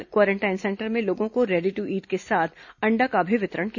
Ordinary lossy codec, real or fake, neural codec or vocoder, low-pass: none; real; none; 7.2 kHz